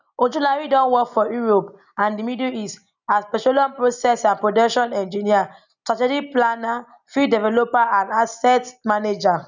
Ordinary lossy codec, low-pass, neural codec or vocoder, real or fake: none; 7.2 kHz; none; real